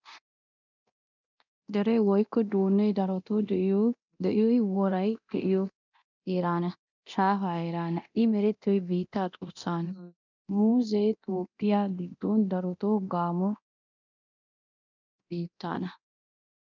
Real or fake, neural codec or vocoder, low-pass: fake; codec, 24 kHz, 0.9 kbps, DualCodec; 7.2 kHz